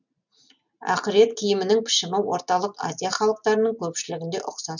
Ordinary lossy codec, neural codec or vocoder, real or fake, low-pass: none; none; real; 7.2 kHz